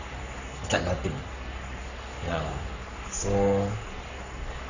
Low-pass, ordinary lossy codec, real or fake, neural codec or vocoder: 7.2 kHz; none; fake; codec, 44.1 kHz, 3.4 kbps, Pupu-Codec